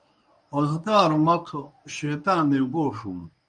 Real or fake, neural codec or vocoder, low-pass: fake; codec, 24 kHz, 0.9 kbps, WavTokenizer, medium speech release version 1; 9.9 kHz